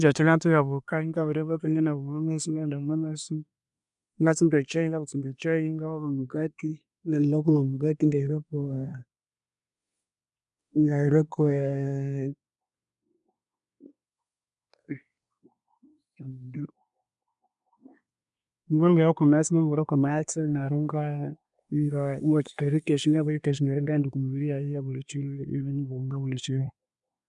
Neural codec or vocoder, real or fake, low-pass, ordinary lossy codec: codec, 24 kHz, 1 kbps, SNAC; fake; 10.8 kHz; none